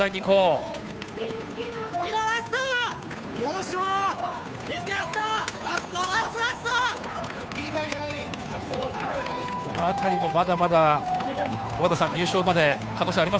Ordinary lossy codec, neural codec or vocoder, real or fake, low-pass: none; codec, 16 kHz, 2 kbps, FunCodec, trained on Chinese and English, 25 frames a second; fake; none